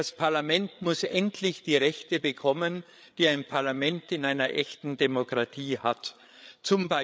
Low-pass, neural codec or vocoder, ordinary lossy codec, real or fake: none; codec, 16 kHz, 8 kbps, FreqCodec, larger model; none; fake